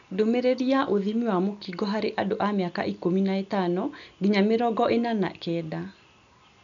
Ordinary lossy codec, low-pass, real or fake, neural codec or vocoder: none; 7.2 kHz; real; none